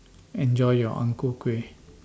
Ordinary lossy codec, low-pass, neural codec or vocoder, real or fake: none; none; none; real